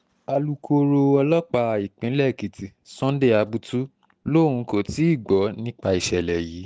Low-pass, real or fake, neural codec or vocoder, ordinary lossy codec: none; real; none; none